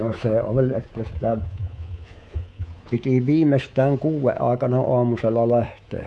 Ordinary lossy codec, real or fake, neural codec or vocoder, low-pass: none; fake; codec, 24 kHz, 3.1 kbps, DualCodec; none